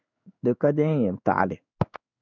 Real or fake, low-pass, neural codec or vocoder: fake; 7.2 kHz; codec, 16 kHz in and 24 kHz out, 1 kbps, XY-Tokenizer